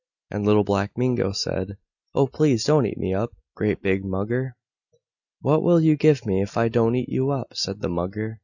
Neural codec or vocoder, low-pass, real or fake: none; 7.2 kHz; real